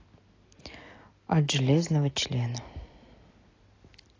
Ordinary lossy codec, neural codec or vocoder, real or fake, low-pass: AAC, 32 kbps; none; real; 7.2 kHz